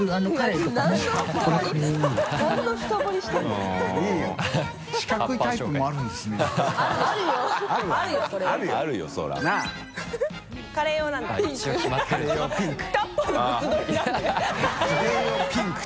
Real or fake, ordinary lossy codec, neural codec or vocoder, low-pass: real; none; none; none